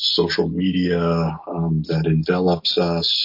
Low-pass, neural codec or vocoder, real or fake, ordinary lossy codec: 5.4 kHz; none; real; MP3, 32 kbps